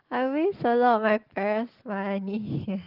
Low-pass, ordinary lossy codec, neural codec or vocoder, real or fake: 5.4 kHz; Opus, 16 kbps; none; real